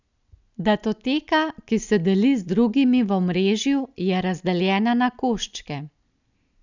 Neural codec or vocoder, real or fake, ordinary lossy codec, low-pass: none; real; none; 7.2 kHz